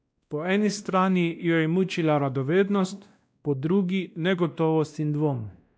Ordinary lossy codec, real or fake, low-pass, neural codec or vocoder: none; fake; none; codec, 16 kHz, 1 kbps, X-Codec, WavLM features, trained on Multilingual LibriSpeech